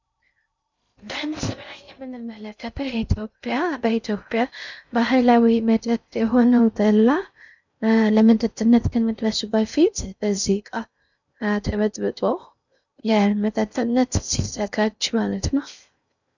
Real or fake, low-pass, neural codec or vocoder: fake; 7.2 kHz; codec, 16 kHz in and 24 kHz out, 0.6 kbps, FocalCodec, streaming, 2048 codes